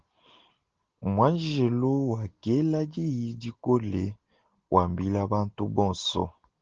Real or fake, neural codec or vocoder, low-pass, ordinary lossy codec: real; none; 7.2 kHz; Opus, 16 kbps